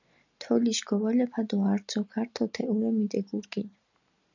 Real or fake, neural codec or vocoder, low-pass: real; none; 7.2 kHz